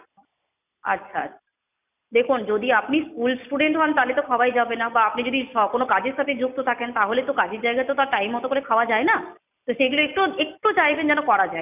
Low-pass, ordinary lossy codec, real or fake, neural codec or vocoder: 3.6 kHz; none; real; none